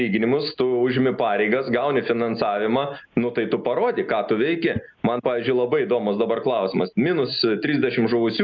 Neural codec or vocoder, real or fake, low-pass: none; real; 7.2 kHz